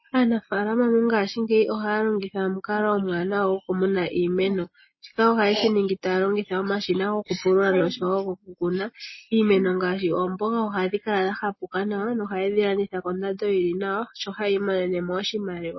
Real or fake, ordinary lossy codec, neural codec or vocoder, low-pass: real; MP3, 24 kbps; none; 7.2 kHz